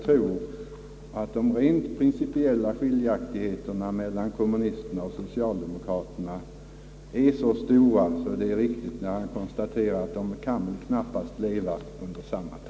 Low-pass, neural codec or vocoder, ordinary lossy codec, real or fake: none; none; none; real